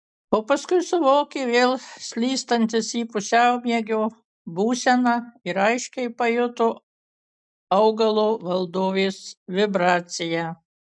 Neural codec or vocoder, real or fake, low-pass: none; real; 9.9 kHz